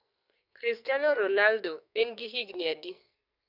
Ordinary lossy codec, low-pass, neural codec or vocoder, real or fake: none; 5.4 kHz; codec, 44.1 kHz, 2.6 kbps, SNAC; fake